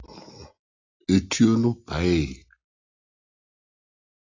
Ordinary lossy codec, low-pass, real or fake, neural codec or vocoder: AAC, 32 kbps; 7.2 kHz; real; none